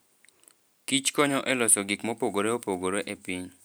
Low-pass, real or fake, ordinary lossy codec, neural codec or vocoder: none; real; none; none